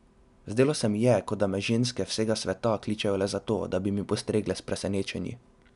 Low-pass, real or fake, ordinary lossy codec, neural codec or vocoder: 10.8 kHz; real; none; none